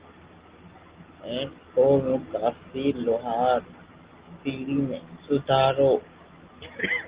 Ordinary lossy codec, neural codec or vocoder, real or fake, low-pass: Opus, 16 kbps; none; real; 3.6 kHz